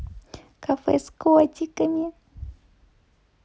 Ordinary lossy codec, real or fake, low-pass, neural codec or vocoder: none; real; none; none